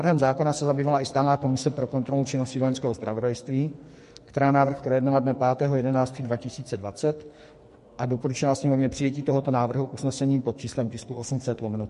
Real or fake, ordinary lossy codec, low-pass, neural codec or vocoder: fake; MP3, 48 kbps; 14.4 kHz; codec, 44.1 kHz, 2.6 kbps, SNAC